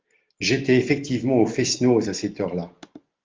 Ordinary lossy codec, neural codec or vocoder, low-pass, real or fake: Opus, 32 kbps; none; 7.2 kHz; real